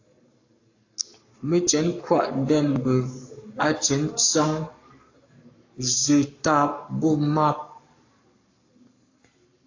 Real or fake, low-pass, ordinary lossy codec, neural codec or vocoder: fake; 7.2 kHz; AAC, 48 kbps; codec, 44.1 kHz, 7.8 kbps, Pupu-Codec